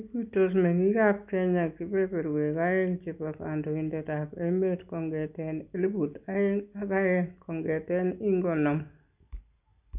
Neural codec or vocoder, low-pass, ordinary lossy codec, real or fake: none; 3.6 kHz; none; real